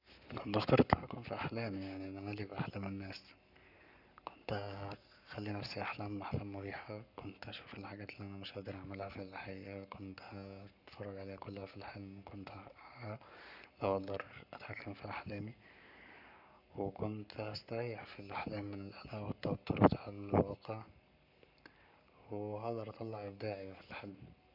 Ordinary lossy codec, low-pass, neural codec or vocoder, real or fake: none; 5.4 kHz; codec, 44.1 kHz, 7.8 kbps, Pupu-Codec; fake